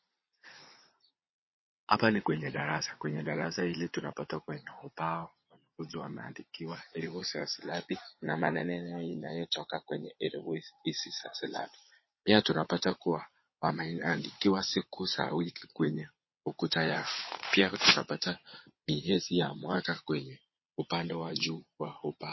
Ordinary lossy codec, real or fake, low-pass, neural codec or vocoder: MP3, 24 kbps; fake; 7.2 kHz; vocoder, 22.05 kHz, 80 mel bands, Vocos